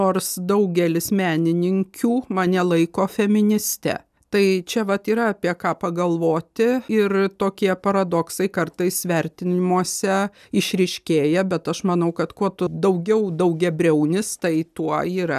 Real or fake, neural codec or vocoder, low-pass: real; none; 14.4 kHz